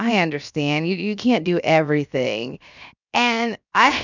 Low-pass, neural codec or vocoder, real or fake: 7.2 kHz; codec, 16 kHz, 0.7 kbps, FocalCodec; fake